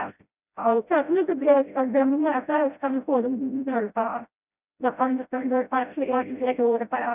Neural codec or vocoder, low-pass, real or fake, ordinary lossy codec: codec, 16 kHz, 0.5 kbps, FreqCodec, smaller model; 3.6 kHz; fake; none